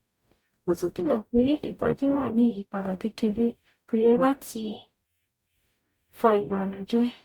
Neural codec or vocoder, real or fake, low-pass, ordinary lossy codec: codec, 44.1 kHz, 0.9 kbps, DAC; fake; 19.8 kHz; Opus, 64 kbps